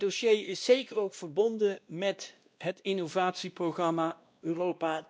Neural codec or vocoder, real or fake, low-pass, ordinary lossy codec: codec, 16 kHz, 1 kbps, X-Codec, WavLM features, trained on Multilingual LibriSpeech; fake; none; none